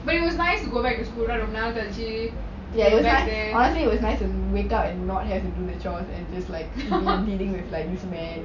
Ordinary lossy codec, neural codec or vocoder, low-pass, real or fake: none; none; 7.2 kHz; real